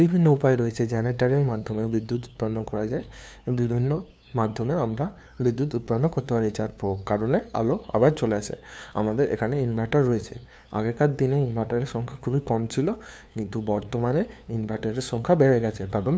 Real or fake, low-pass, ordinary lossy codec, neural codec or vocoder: fake; none; none; codec, 16 kHz, 2 kbps, FunCodec, trained on LibriTTS, 25 frames a second